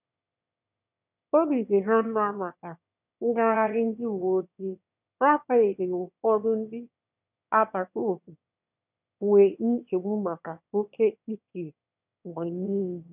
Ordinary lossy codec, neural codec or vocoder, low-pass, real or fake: none; autoencoder, 22.05 kHz, a latent of 192 numbers a frame, VITS, trained on one speaker; 3.6 kHz; fake